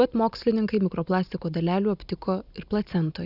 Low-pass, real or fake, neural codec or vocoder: 5.4 kHz; real; none